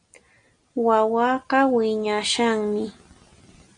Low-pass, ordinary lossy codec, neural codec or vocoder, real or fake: 9.9 kHz; MP3, 48 kbps; none; real